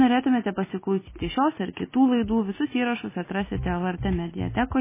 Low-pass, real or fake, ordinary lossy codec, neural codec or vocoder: 3.6 kHz; real; MP3, 16 kbps; none